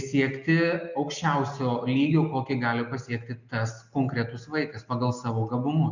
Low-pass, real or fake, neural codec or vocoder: 7.2 kHz; real; none